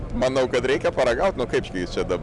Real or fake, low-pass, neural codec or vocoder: real; 10.8 kHz; none